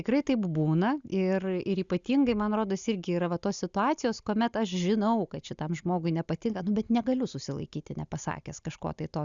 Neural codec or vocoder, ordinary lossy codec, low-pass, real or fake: none; Opus, 64 kbps; 7.2 kHz; real